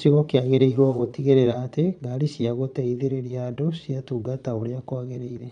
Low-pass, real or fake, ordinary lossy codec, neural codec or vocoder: 9.9 kHz; fake; none; vocoder, 22.05 kHz, 80 mel bands, Vocos